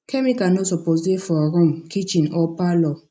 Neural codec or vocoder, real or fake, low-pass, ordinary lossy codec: none; real; none; none